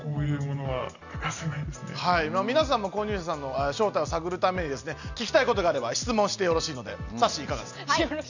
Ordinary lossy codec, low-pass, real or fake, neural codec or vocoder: none; 7.2 kHz; real; none